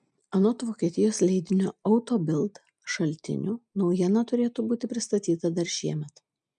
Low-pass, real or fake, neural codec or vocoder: 10.8 kHz; real; none